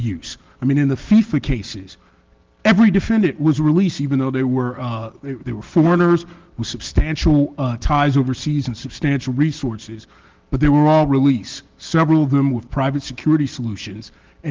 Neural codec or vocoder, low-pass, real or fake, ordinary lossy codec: none; 7.2 kHz; real; Opus, 16 kbps